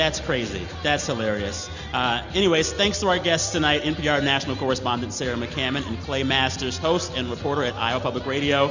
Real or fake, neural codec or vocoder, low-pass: real; none; 7.2 kHz